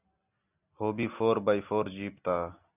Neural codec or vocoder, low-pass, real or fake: none; 3.6 kHz; real